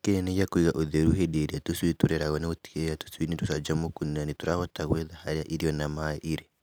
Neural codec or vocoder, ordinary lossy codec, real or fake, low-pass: none; none; real; none